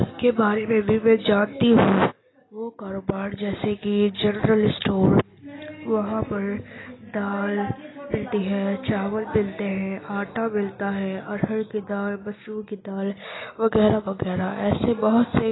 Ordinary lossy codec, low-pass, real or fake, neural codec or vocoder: AAC, 16 kbps; 7.2 kHz; real; none